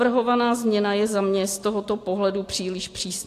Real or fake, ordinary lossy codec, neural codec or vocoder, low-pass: real; AAC, 64 kbps; none; 14.4 kHz